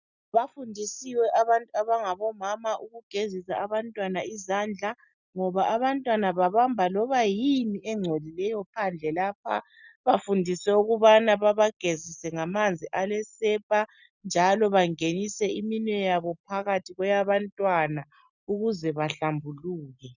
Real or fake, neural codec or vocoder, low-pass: real; none; 7.2 kHz